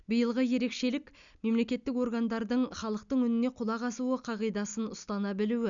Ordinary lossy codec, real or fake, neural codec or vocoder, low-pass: none; real; none; 7.2 kHz